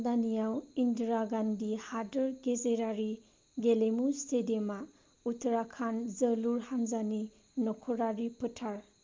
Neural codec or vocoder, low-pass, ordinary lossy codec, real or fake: none; 7.2 kHz; Opus, 24 kbps; real